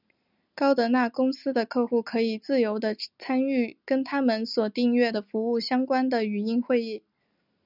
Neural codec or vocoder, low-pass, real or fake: none; 5.4 kHz; real